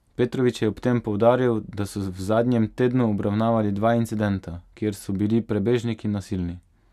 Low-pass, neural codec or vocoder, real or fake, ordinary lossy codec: 14.4 kHz; none; real; none